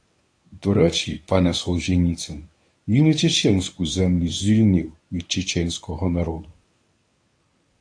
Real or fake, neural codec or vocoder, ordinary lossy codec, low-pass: fake; codec, 24 kHz, 0.9 kbps, WavTokenizer, medium speech release version 1; AAC, 48 kbps; 9.9 kHz